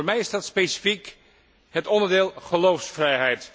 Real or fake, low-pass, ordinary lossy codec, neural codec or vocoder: real; none; none; none